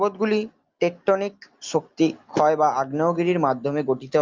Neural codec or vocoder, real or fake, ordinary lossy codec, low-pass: none; real; Opus, 24 kbps; 7.2 kHz